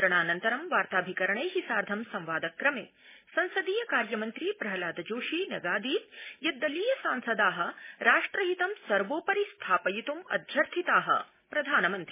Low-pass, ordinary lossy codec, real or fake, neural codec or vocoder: 3.6 kHz; MP3, 16 kbps; real; none